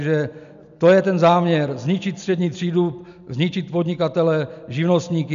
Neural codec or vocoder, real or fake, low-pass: none; real; 7.2 kHz